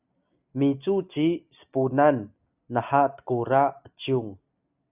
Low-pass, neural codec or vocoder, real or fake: 3.6 kHz; none; real